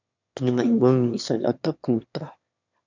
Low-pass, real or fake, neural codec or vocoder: 7.2 kHz; fake; autoencoder, 22.05 kHz, a latent of 192 numbers a frame, VITS, trained on one speaker